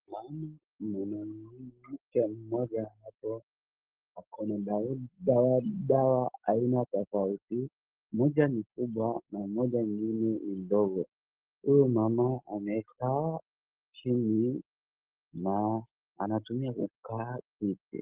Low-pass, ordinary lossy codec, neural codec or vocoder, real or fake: 3.6 kHz; Opus, 16 kbps; none; real